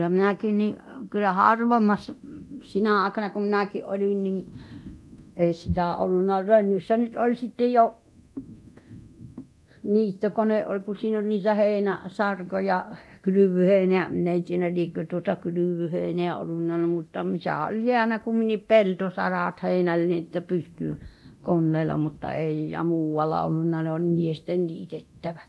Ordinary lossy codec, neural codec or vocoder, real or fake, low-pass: none; codec, 24 kHz, 0.9 kbps, DualCodec; fake; none